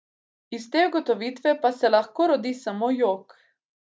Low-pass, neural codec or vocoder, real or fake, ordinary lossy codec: none; none; real; none